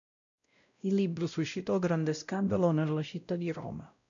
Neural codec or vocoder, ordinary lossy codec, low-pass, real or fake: codec, 16 kHz, 0.5 kbps, X-Codec, WavLM features, trained on Multilingual LibriSpeech; MP3, 96 kbps; 7.2 kHz; fake